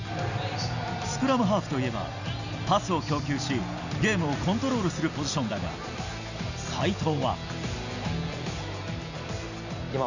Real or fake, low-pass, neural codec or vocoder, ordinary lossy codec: real; 7.2 kHz; none; none